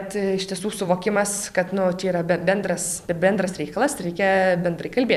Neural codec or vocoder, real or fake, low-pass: vocoder, 44.1 kHz, 128 mel bands every 256 samples, BigVGAN v2; fake; 14.4 kHz